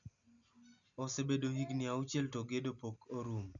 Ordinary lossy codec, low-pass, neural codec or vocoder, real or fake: none; 7.2 kHz; none; real